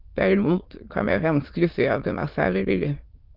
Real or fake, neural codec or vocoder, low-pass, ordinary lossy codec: fake; autoencoder, 22.05 kHz, a latent of 192 numbers a frame, VITS, trained on many speakers; 5.4 kHz; Opus, 32 kbps